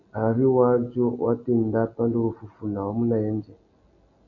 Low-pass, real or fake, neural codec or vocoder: 7.2 kHz; real; none